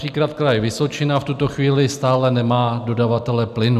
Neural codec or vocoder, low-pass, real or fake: none; 14.4 kHz; real